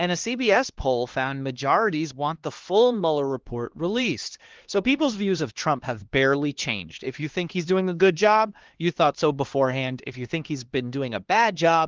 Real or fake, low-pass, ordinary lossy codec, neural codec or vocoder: fake; 7.2 kHz; Opus, 16 kbps; codec, 16 kHz, 2 kbps, X-Codec, HuBERT features, trained on LibriSpeech